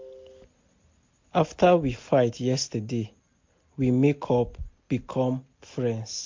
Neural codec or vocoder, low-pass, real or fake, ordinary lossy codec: none; 7.2 kHz; real; MP3, 48 kbps